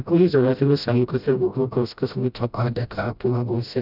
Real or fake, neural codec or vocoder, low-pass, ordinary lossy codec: fake; codec, 16 kHz, 0.5 kbps, FreqCodec, smaller model; 5.4 kHz; none